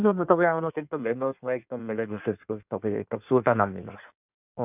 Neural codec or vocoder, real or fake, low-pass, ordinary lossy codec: codec, 16 kHz in and 24 kHz out, 1.1 kbps, FireRedTTS-2 codec; fake; 3.6 kHz; none